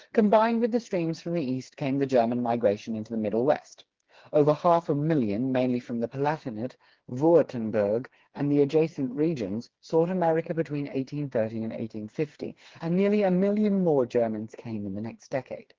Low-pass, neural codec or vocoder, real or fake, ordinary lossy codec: 7.2 kHz; codec, 16 kHz, 4 kbps, FreqCodec, smaller model; fake; Opus, 16 kbps